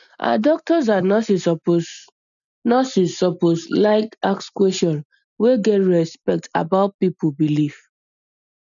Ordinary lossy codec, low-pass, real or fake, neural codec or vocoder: none; 7.2 kHz; real; none